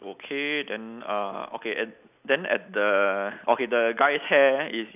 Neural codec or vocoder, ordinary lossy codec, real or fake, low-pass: none; none; real; 3.6 kHz